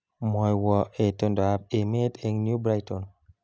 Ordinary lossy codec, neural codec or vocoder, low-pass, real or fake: none; none; none; real